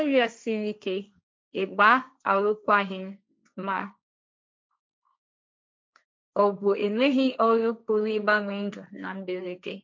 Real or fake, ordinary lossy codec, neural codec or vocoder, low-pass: fake; none; codec, 16 kHz, 1.1 kbps, Voila-Tokenizer; none